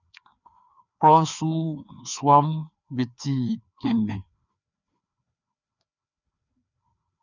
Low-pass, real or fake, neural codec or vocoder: 7.2 kHz; fake; codec, 16 kHz, 2 kbps, FreqCodec, larger model